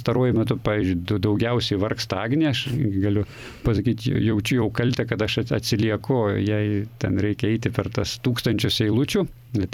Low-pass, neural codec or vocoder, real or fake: 19.8 kHz; vocoder, 44.1 kHz, 128 mel bands every 256 samples, BigVGAN v2; fake